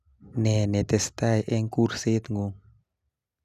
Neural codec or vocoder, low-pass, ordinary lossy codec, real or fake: none; 14.4 kHz; none; real